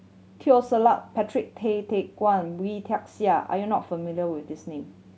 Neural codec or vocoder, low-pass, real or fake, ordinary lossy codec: none; none; real; none